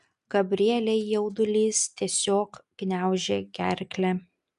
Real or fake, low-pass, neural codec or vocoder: real; 10.8 kHz; none